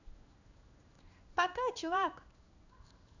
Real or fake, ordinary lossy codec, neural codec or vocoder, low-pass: fake; none; codec, 16 kHz in and 24 kHz out, 1 kbps, XY-Tokenizer; 7.2 kHz